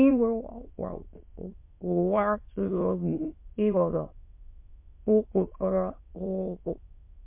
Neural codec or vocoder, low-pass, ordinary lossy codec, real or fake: autoencoder, 22.05 kHz, a latent of 192 numbers a frame, VITS, trained on many speakers; 3.6 kHz; MP3, 24 kbps; fake